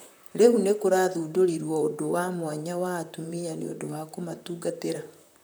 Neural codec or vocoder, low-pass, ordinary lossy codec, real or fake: vocoder, 44.1 kHz, 128 mel bands, Pupu-Vocoder; none; none; fake